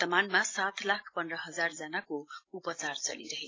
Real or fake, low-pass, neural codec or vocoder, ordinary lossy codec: real; 7.2 kHz; none; AAC, 32 kbps